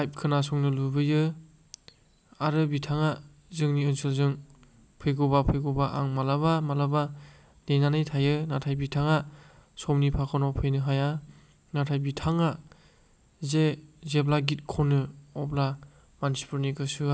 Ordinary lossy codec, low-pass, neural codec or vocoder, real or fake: none; none; none; real